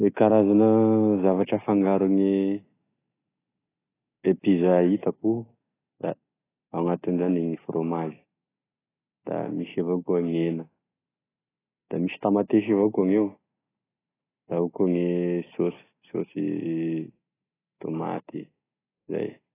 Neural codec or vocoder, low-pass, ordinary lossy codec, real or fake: none; 3.6 kHz; AAC, 16 kbps; real